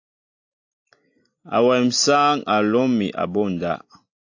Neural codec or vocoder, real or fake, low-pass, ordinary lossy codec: none; real; 7.2 kHz; AAC, 48 kbps